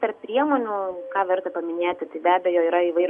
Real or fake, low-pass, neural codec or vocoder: real; 10.8 kHz; none